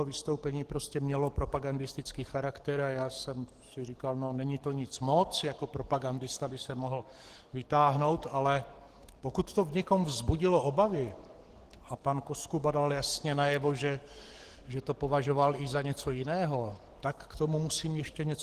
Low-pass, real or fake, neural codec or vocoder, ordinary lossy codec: 14.4 kHz; fake; codec, 44.1 kHz, 7.8 kbps, DAC; Opus, 16 kbps